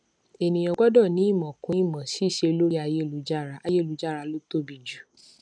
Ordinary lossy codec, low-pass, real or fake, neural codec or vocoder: none; 9.9 kHz; real; none